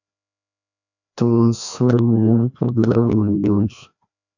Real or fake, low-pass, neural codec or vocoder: fake; 7.2 kHz; codec, 16 kHz, 1 kbps, FreqCodec, larger model